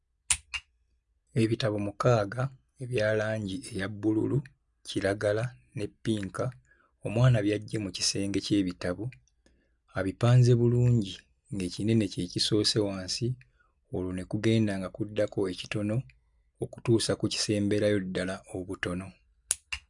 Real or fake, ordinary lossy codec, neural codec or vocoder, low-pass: real; none; none; 10.8 kHz